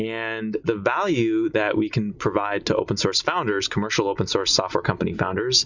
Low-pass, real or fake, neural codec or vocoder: 7.2 kHz; real; none